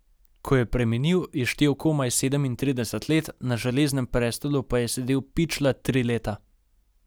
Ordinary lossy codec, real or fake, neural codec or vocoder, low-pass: none; real; none; none